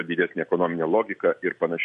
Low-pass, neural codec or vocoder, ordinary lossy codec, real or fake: 14.4 kHz; none; MP3, 48 kbps; real